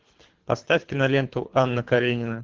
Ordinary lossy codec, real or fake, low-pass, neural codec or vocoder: Opus, 16 kbps; fake; 7.2 kHz; codec, 24 kHz, 3 kbps, HILCodec